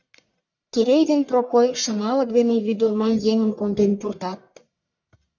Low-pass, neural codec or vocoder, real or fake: 7.2 kHz; codec, 44.1 kHz, 1.7 kbps, Pupu-Codec; fake